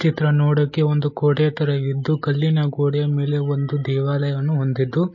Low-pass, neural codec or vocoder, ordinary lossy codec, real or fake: 7.2 kHz; none; MP3, 32 kbps; real